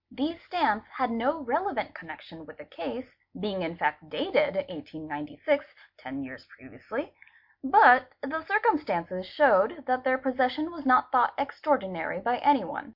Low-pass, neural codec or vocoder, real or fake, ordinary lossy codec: 5.4 kHz; none; real; MP3, 48 kbps